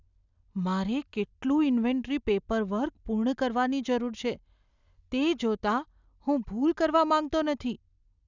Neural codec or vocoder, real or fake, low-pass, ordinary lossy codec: none; real; 7.2 kHz; none